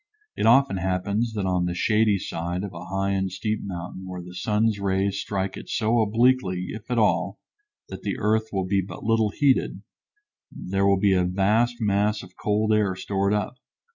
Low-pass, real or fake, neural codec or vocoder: 7.2 kHz; real; none